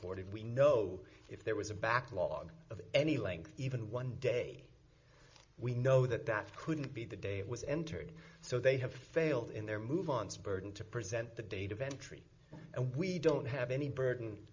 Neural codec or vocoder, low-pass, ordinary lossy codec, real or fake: none; 7.2 kHz; MP3, 48 kbps; real